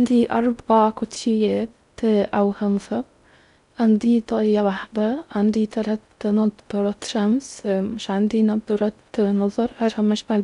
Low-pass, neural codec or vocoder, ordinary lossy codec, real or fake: 10.8 kHz; codec, 16 kHz in and 24 kHz out, 0.6 kbps, FocalCodec, streaming, 4096 codes; none; fake